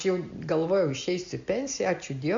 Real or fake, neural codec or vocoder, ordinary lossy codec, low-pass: real; none; AAC, 64 kbps; 7.2 kHz